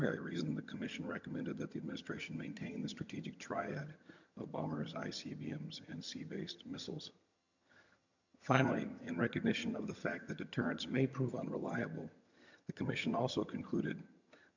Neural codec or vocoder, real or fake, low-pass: vocoder, 22.05 kHz, 80 mel bands, HiFi-GAN; fake; 7.2 kHz